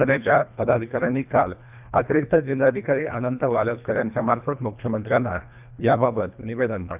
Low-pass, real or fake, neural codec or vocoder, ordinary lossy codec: 3.6 kHz; fake; codec, 24 kHz, 1.5 kbps, HILCodec; none